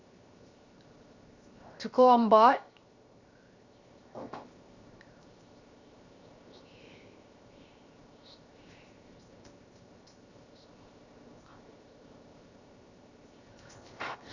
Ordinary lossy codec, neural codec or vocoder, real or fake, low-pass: Opus, 64 kbps; codec, 16 kHz, 0.7 kbps, FocalCodec; fake; 7.2 kHz